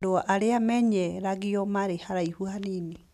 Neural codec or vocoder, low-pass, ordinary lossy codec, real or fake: none; 14.4 kHz; none; real